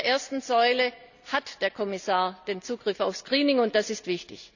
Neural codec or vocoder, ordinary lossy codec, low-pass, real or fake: none; none; 7.2 kHz; real